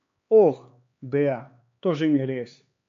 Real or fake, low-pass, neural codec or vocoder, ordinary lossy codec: fake; 7.2 kHz; codec, 16 kHz, 4 kbps, X-Codec, HuBERT features, trained on LibriSpeech; MP3, 48 kbps